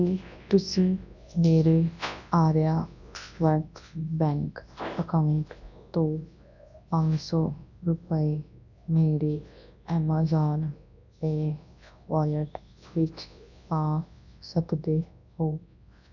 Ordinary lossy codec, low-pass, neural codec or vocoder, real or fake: none; 7.2 kHz; codec, 24 kHz, 0.9 kbps, WavTokenizer, large speech release; fake